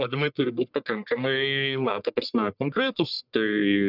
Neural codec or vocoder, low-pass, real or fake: codec, 44.1 kHz, 1.7 kbps, Pupu-Codec; 5.4 kHz; fake